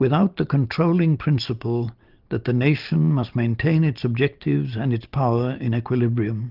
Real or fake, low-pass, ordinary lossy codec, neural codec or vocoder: real; 5.4 kHz; Opus, 24 kbps; none